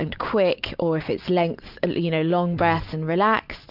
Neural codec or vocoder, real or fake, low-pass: none; real; 5.4 kHz